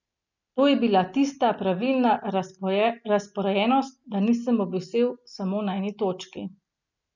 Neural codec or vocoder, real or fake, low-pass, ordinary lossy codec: none; real; 7.2 kHz; none